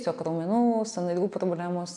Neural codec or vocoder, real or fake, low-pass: none; real; 10.8 kHz